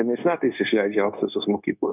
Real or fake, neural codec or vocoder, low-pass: fake; codec, 16 kHz, 0.9 kbps, LongCat-Audio-Codec; 3.6 kHz